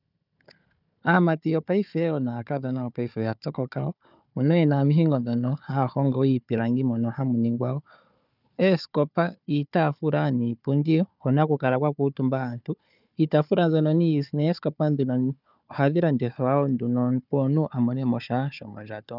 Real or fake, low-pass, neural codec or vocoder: fake; 5.4 kHz; codec, 16 kHz, 4 kbps, FunCodec, trained on Chinese and English, 50 frames a second